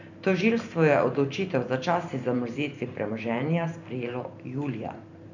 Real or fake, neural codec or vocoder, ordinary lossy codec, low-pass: fake; vocoder, 44.1 kHz, 128 mel bands every 512 samples, BigVGAN v2; none; 7.2 kHz